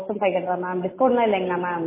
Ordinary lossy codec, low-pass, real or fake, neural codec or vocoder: MP3, 16 kbps; 3.6 kHz; real; none